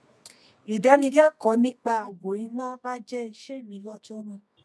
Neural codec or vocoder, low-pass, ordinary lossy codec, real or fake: codec, 24 kHz, 0.9 kbps, WavTokenizer, medium music audio release; none; none; fake